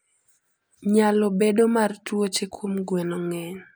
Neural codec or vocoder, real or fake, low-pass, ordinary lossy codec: none; real; none; none